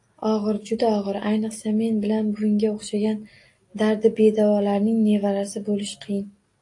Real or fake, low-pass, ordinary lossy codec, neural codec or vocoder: real; 10.8 kHz; AAC, 48 kbps; none